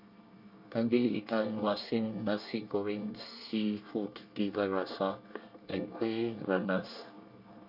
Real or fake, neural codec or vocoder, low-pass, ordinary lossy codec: fake; codec, 24 kHz, 1 kbps, SNAC; 5.4 kHz; MP3, 48 kbps